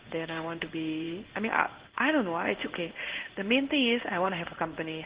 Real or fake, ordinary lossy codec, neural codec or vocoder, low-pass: fake; Opus, 16 kbps; codec, 16 kHz in and 24 kHz out, 1 kbps, XY-Tokenizer; 3.6 kHz